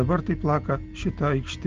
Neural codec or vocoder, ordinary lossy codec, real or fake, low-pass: none; Opus, 32 kbps; real; 7.2 kHz